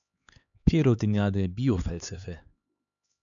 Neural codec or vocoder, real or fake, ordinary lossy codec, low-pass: codec, 16 kHz, 4 kbps, X-Codec, HuBERT features, trained on LibriSpeech; fake; MP3, 96 kbps; 7.2 kHz